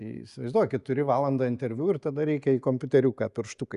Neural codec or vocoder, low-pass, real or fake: none; 10.8 kHz; real